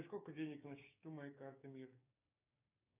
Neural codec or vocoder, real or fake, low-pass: codec, 16 kHz, 6 kbps, DAC; fake; 3.6 kHz